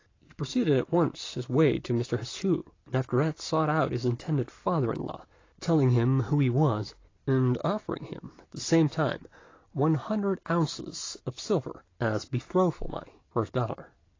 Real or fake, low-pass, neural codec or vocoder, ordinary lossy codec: real; 7.2 kHz; none; AAC, 32 kbps